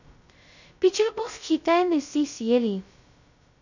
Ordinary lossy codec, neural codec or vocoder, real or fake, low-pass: none; codec, 16 kHz, 0.2 kbps, FocalCodec; fake; 7.2 kHz